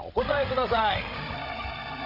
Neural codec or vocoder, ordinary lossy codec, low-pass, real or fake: codec, 16 kHz, 16 kbps, FreqCodec, larger model; none; 5.4 kHz; fake